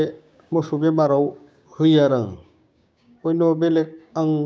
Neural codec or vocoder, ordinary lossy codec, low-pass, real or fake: codec, 16 kHz, 6 kbps, DAC; none; none; fake